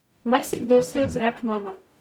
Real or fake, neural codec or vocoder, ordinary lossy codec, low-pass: fake; codec, 44.1 kHz, 0.9 kbps, DAC; none; none